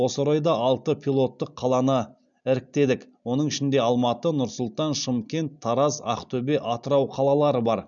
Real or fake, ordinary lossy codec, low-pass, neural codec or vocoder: real; none; 7.2 kHz; none